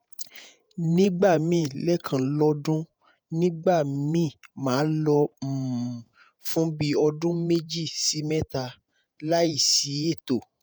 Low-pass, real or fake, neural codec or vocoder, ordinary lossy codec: none; fake; vocoder, 48 kHz, 128 mel bands, Vocos; none